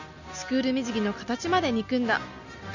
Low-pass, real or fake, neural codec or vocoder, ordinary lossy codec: 7.2 kHz; real; none; none